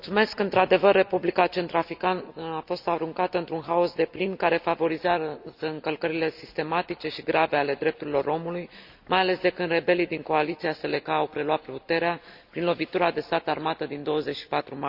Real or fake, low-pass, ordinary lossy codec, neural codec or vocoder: real; 5.4 kHz; Opus, 64 kbps; none